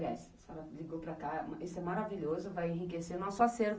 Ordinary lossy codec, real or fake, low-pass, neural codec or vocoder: none; real; none; none